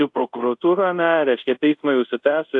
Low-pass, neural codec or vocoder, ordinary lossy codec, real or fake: 10.8 kHz; codec, 24 kHz, 0.5 kbps, DualCodec; AAC, 64 kbps; fake